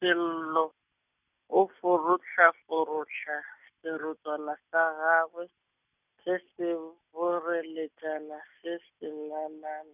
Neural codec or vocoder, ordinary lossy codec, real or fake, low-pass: none; none; real; 3.6 kHz